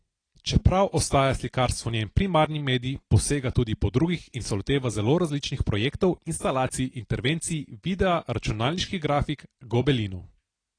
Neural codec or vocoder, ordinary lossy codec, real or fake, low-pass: none; AAC, 32 kbps; real; 9.9 kHz